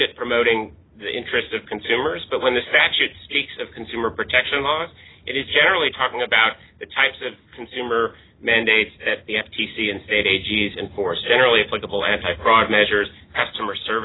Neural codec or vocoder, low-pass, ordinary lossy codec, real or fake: none; 7.2 kHz; AAC, 16 kbps; real